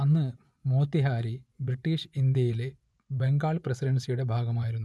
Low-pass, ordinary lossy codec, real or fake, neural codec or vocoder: none; none; fake; vocoder, 24 kHz, 100 mel bands, Vocos